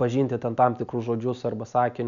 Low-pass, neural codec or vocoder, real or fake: 7.2 kHz; none; real